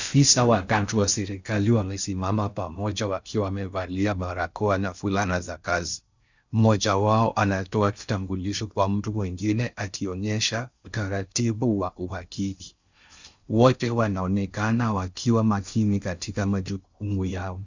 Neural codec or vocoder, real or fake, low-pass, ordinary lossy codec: codec, 16 kHz in and 24 kHz out, 0.6 kbps, FocalCodec, streaming, 4096 codes; fake; 7.2 kHz; Opus, 64 kbps